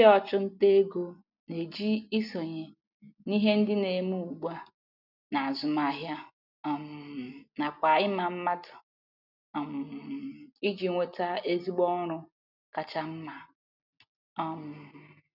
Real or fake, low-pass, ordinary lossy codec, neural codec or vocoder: real; 5.4 kHz; none; none